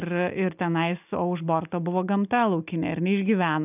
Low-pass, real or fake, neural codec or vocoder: 3.6 kHz; real; none